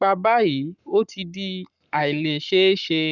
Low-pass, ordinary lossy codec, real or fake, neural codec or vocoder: 7.2 kHz; none; fake; codec, 44.1 kHz, 7.8 kbps, Pupu-Codec